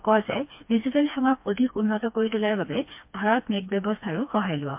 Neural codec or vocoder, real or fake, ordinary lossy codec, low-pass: codec, 16 kHz, 2 kbps, FreqCodec, smaller model; fake; MP3, 32 kbps; 3.6 kHz